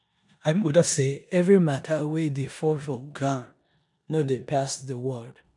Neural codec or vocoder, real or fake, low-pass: codec, 16 kHz in and 24 kHz out, 0.9 kbps, LongCat-Audio-Codec, four codebook decoder; fake; 10.8 kHz